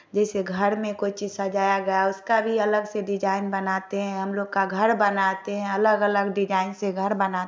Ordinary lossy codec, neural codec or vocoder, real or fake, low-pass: none; none; real; none